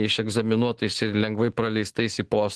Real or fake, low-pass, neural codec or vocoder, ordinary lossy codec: fake; 10.8 kHz; autoencoder, 48 kHz, 128 numbers a frame, DAC-VAE, trained on Japanese speech; Opus, 16 kbps